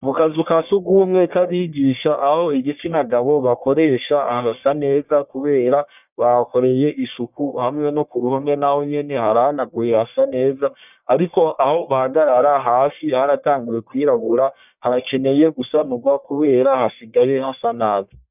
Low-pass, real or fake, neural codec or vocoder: 3.6 kHz; fake; codec, 44.1 kHz, 1.7 kbps, Pupu-Codec